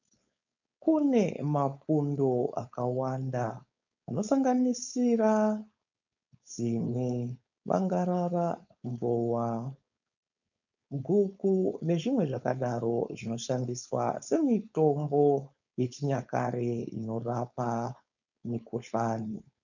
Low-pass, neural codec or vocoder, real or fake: 7.2 kHz; codec, 16 kHz, 4.8 kbps, FACodec; fake